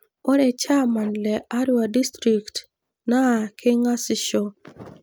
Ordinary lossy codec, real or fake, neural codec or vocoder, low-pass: none; real; none; none